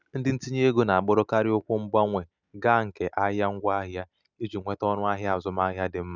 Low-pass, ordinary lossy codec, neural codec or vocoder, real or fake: 7.2 kHz; none; none; real